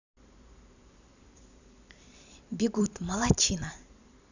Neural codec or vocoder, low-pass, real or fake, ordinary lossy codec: vocoder, 44.1 kHz, 128 mel bands every 256 samples, BigVGAN v2; 7.2 kHz; fake; none